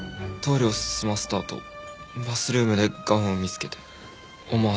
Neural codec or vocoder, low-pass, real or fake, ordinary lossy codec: none; none; real; none